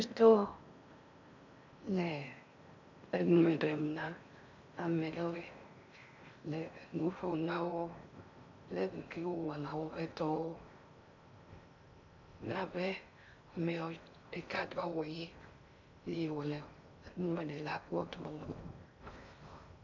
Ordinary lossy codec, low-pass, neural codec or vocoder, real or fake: MP3, 64 kbps; 7.2 kHz; codec, 16 kHz in and 24 kHz out, 0.6 kbps, FocalCodec, streaming, 4096 codes; fake